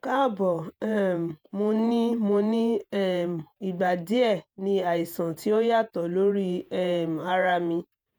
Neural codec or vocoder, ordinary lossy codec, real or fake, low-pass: vocoder, 48 kHz, 128 mel bands, Vocos; none; fake; none